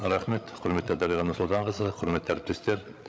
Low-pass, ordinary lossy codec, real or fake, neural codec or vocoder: none; none; fake; codec, 16 kHz, 16 kbps, FreqCodec, larger model